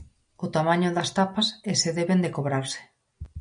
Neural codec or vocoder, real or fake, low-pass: none; real; 9.9 kHz